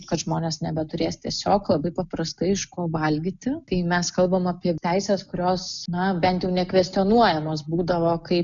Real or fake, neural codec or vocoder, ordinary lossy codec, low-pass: real; none; MP3, 96 kbps; 7.2 kHz